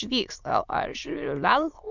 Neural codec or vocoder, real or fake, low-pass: autoencoder, 22.05 kHz, a latent of 192 numbers a frame, VITS, trained on many speakers; fake; 7.2 kHz